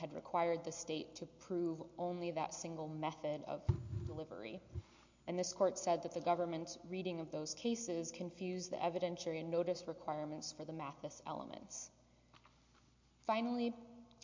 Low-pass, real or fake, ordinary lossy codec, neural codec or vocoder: 7.2 kHz; real; MP3, 48 kbps; none